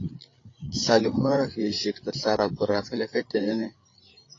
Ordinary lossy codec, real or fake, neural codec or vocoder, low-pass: AAC, 32 kbps; fake; codec, 16 kHz, 8 kbps, FreqCodec, larger model; 7.2 kHz